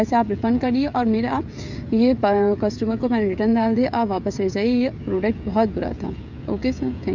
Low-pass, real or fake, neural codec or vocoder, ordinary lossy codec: 7.2 kHz; fake; codec, 16 kHz, 16 kbps, FreqCodec, smaller model; none